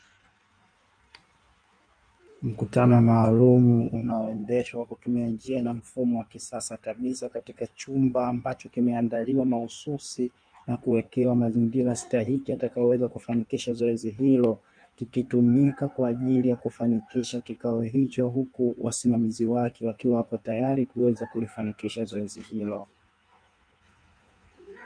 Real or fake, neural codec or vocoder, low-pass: fake; codec, 16 kHz in and 24 kHz out, 1.1 kbps, FireRedTTS-2 codec; 9.9 kHz